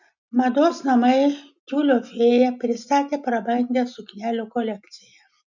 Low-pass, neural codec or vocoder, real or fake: 7.2 kHz; none; real